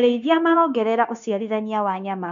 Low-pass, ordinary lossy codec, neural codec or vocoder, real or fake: 7.2 kHz; none; codec, 16 kHz, 0.9 kbps, LongCat-Audio-Codec; fake